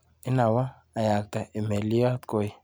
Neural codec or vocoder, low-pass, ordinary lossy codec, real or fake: none; none; none; real